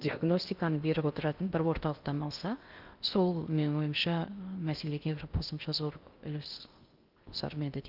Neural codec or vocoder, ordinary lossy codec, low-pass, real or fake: codec, 16 kHz in and 24 kHz out, 0.6 kbps, FocalCodec, streaming, 2048 codes; Opus, 32 kbps; 5.4 kHz; fake